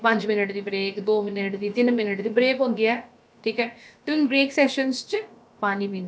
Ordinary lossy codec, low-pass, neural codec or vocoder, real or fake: none; none; codec, 16 kHz, about 1 kbps, DyCAST, with the encoder's durations; fake